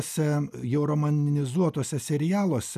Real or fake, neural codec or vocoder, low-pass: real; none; 14.4 kHz